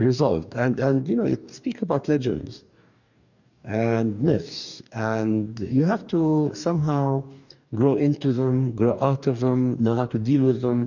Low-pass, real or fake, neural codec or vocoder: 7.2 kHz; fake; codec, 44.1 kHz, 2.6 kbps, DAC